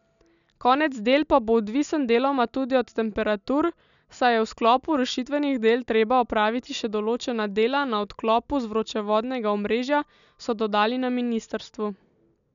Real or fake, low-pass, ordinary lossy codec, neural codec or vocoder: real; 7.2 kHz; none; none